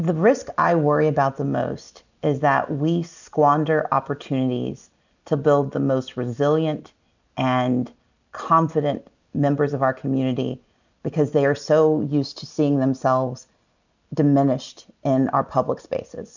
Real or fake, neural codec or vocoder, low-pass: real; none; 7.2 kHz